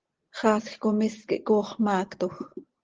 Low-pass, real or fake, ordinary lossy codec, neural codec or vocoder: 7.2 kHz; real; Opus, 16 kbps; none